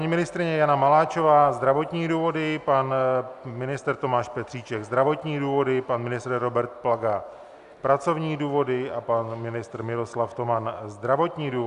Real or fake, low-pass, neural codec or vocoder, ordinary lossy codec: real; 10.8 kHz; none; Opus, 64 kbps